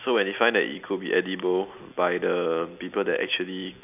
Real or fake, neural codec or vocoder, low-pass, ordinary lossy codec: real; none; 3.6 kHz; none